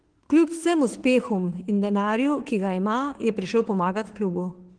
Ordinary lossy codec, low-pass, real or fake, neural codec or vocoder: Opus, 16 kbps; 9.9 kHz; fake; autoencoder, 48 kHz, 32 numbers a frame, DAC-VAE, trained on Japanese speech